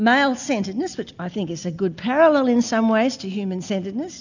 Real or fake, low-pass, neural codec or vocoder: real; 7.2 kHz; none